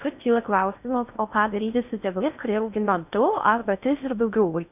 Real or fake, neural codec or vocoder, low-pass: fake; codec, 16 kHz in and 24 kHz out, 0.6 kbps, FocalCodec, streaming, 4096 codes; 3.6 kHz